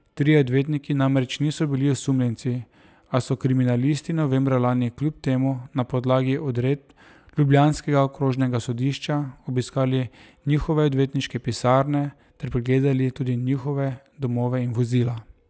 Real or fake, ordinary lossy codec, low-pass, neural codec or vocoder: real; none; none; none